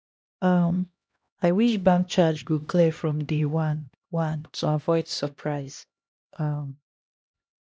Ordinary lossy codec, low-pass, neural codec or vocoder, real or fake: none; none; codec, 16 kHz, 1 kbps, X-Codec, HuBERT features, trained on LibriSpeech; fake